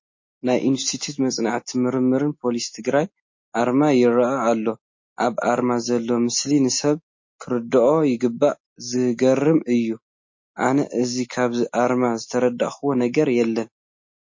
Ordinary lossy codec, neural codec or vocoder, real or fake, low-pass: MP3, 32 kbps; none; real; 7.2 kHz